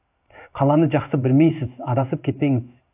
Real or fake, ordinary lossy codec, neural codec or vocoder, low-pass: fake; AAC, 32 kbps; codec, 16 kHz in and 24 kHz out, 1 kbps, XY-Tokenizer; 3.6 kHz